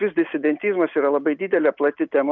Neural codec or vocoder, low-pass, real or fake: none; 7.2 kHz; real